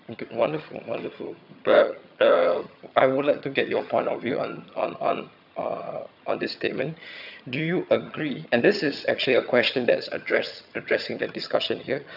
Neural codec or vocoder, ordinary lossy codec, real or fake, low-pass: vocoder, 22.05 kHz, 80 mel bands, HiFi-GAN; none; fake; 5.4 kHz